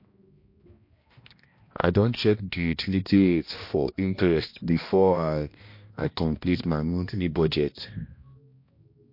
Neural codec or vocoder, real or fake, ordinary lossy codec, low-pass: codec, 16 kHz, 1 kbps, X-Codec, HuBERT features, trained on balanced general audio; fake; MP3, 32 kbps; 5.4 kHz